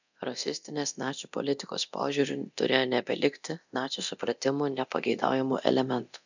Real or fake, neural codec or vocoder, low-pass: fake; codec, 24 kHz, 0.9 kbps, DualCodec; 7.2 kHz